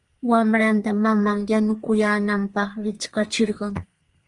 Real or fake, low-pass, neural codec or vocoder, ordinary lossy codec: fake; 10.8 kHz; codec, 32 kHz, 1.9 kbps, SNAC; Opus, 24 kbps